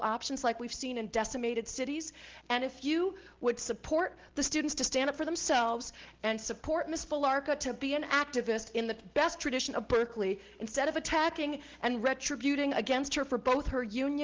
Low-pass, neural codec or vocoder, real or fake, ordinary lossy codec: 7.2 kHz; none; real; Opus, 16 kbps